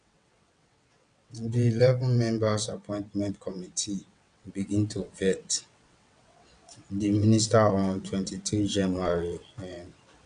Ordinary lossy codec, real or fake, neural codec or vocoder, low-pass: none; fake; vocoder, 22.05 kHz, 80 mel bands, WaveNeXt; 9.9 kHz